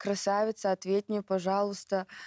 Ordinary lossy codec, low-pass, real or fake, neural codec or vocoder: none; none; real; none